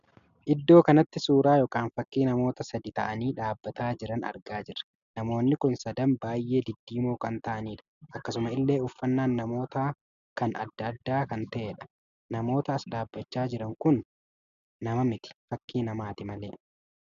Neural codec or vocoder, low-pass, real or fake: none; 7.2 kHz; real